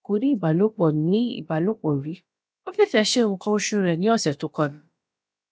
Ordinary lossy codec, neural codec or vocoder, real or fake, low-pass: none; codec, 16 kHz, about 1 kbps, DyCAST, with the encoder's durations; fake; none